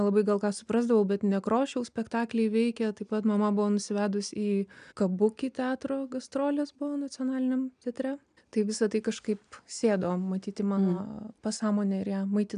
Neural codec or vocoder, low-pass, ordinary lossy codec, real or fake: none; 9.9 kHz; MP3, 96 kbps; real